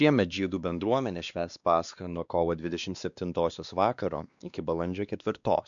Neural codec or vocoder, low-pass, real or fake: codec, 16 kHz, 2 kbps, X-Codec, WavLM features, trained on Multilingual LibriSpeech; 7.2 kHz; fake